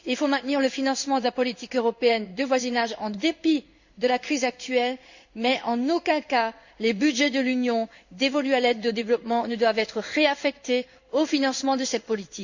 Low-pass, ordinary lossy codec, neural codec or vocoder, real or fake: 7.2 kHz; Opus, 64 kbps; codec, 16 kHz in and 24 kHz out, 1 kbps, XY-Tokenizer; fake